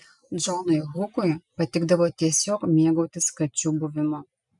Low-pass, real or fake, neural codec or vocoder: 10.8 kHz; real; none